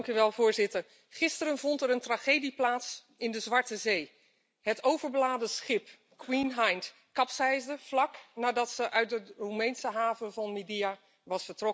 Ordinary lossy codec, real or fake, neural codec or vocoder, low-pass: none; real; none; none